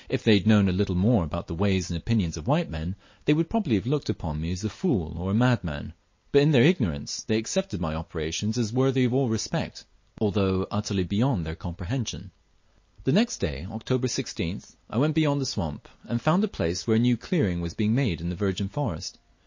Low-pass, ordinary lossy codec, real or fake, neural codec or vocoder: 7.2 kHz; MP3, 32 kbps; real; none